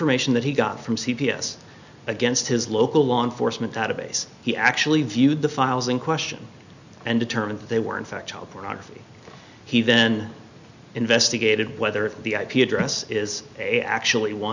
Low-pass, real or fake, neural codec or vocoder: 7.2 kHz; real; none